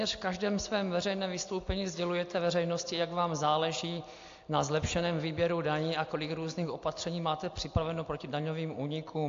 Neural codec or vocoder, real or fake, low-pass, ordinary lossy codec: none; real; 7.2 kHz; AAC, 48 kbps